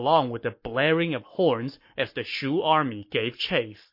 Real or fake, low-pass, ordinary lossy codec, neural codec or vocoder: fake; 5.4 kHz; MP3, 32 kbps; codec, 44.1 kHz, 7.8 kbps, Pupu-Codec